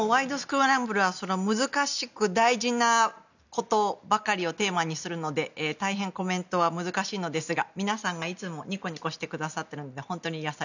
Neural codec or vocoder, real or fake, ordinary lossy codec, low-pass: none; real; none; 7.2 kHz